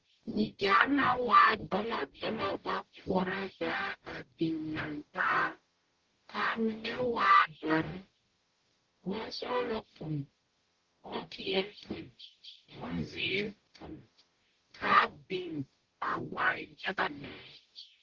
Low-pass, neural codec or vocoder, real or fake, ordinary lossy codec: 7.2 kHz; codec, 44.1 kHz, 0.9 kbps, DAC; fake; Opus, 16 kbps